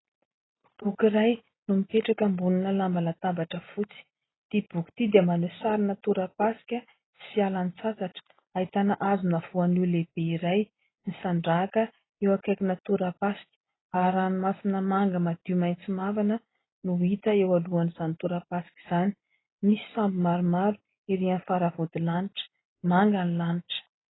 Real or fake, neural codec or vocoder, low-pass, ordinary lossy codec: real; none; 7.2 kHz; AAC, 16 kbps